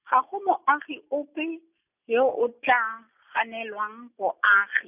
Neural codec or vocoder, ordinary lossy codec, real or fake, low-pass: none; none; real; 3.6 kHz